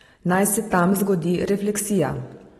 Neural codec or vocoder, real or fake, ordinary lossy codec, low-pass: none; real; AAC, 32 kbps; 19.8 kHz